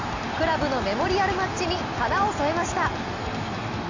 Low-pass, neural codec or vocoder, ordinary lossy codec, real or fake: 7.2 kHz; none; AAC, 48 kbps; real